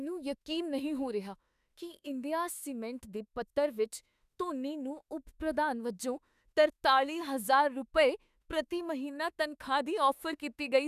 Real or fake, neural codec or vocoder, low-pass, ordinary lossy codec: fake; autoencoder, 48 kHz, 32 numbers a frame, DAC-VAE, trained on Japanese speech; 14.4 kHz; none